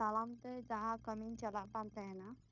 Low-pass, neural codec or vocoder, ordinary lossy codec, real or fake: 7.2 kHz; codec, 16 kHz, 0.9 kbps, LongCat-Audio-Codec; none; fake